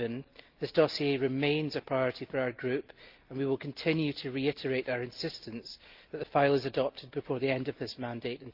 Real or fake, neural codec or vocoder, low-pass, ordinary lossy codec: real; none; 5.4 kHz; Opus, 32 kbps